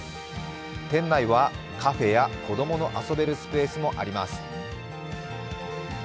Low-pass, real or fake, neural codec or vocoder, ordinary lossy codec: none; real; none; none